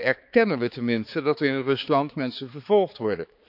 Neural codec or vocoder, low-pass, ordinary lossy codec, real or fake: codec, 16 kHz, 4 kbps, X-Codec, HuBERT features, trained on balanced general audio; 5.4 kHz; none; fake